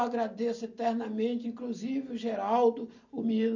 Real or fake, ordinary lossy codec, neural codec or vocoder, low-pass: real; none; none; 7.2 kHz